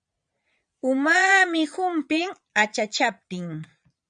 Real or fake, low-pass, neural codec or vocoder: fake; 9.9 kHz; vocoder, 22.05 kHz, 80 mel bands, Vocos